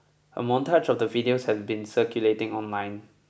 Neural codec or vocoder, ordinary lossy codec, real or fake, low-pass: none; none; real; none